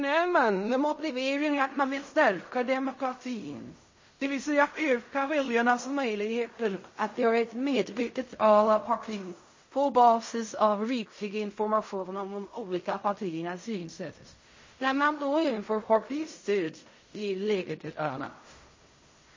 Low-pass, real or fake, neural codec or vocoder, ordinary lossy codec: 7.2 kHz; fake; codec, 16 kHz in and 24 kHz out, 0.4 kbps, LongCat-Audio-Codec, fine tuned four codebook decoder; MP3, 32 kbps